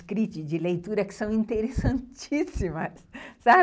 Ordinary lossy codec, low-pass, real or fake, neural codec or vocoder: none; none; real; none